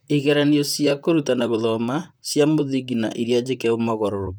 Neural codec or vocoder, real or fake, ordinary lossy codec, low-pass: vocoder, 44.1 kHz, 128 mel bands, Pupu-Vocoder; fake; none; none